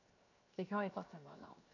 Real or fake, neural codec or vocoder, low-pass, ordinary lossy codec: fake; codec, 16 kHz, 0.7 kbps, FocalCodec; 7.2 kHz; none